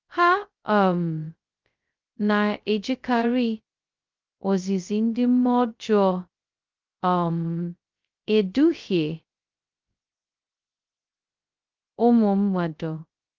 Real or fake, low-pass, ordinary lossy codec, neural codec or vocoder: fake; 7.2 kHz; Opus, 32 kbps; codec, 16 kHz, 0.2 kbps, FocalCodec